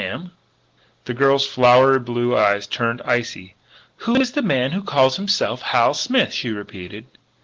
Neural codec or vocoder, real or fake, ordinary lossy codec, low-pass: none; real; Opus, 16 kbps; 7.2 kHz